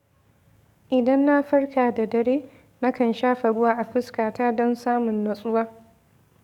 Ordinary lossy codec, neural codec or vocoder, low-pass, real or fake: none; codec, 44.1 kHz, 7.8 kbps, DAC; 19.8 kHz; fake